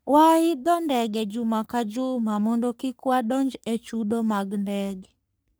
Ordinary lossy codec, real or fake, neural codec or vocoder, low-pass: none; fake; codec, 44.1 kHz, 3.4 kbps, Pupu-Codec; none